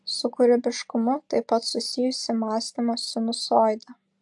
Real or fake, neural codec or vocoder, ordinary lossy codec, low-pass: real; none; AAC, 64 kbps; 10.8 kHz